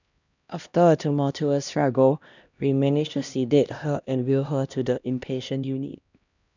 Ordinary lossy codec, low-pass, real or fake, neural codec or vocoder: none; 7.2 kHz; fake; codec, 16 kHz, 1 kbps, X-Codec, HuBERT features, trained on LibriSpeech